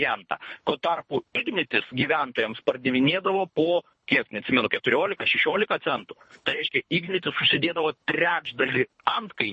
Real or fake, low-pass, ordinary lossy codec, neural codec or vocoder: fake; 10.8 kHz; MP3, 32 kbps; codec, 24 kHz, 3 kbps, HILCodec